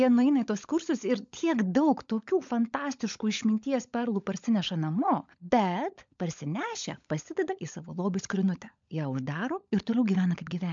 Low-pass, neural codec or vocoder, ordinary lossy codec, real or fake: 7.2 kHz; codec, 16 kHz, 16 kbps, FunCodec, trained on LibriTTS, 50 frames a second; MP3, 64 kbps; fake